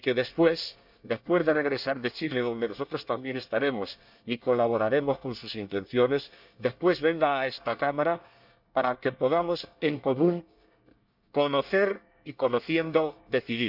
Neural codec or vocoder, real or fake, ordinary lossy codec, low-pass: codec, 24 kHz, 1 kbps, SNAC; fake; none; 5.4 kHz